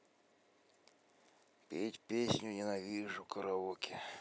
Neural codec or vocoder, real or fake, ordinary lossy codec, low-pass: none; real; none; none